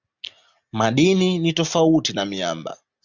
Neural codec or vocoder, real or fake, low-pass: none; real; 7.2 kHz